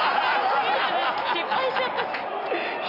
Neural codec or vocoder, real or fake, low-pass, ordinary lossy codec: none; real; 5.4 kHz; none